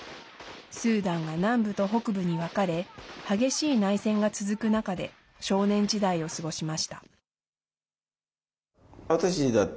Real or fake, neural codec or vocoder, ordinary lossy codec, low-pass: real; none; none; none